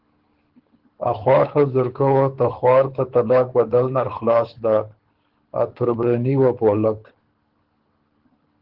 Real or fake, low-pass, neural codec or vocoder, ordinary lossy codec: fake; 5.4 kHz; codec, 24 kHz, 6 kbps, HILCodec; Opus, 16 kbps